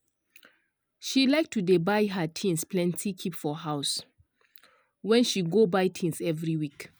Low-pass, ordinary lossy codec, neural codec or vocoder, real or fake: none; none; none; real